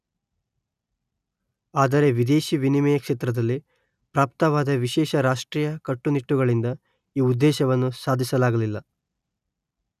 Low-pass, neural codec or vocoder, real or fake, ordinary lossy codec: 14.4 kHz; none; real; none